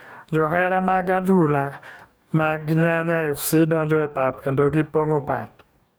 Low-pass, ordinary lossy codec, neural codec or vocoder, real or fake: none; none; codec, 44.1 kHz, 2.6 kbps, DAC; fake